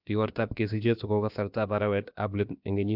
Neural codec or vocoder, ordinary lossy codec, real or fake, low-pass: codec, 16 kHz, about 1 kbps, DyCAST, with the encoder's durations; none; fake; 5.4 kHz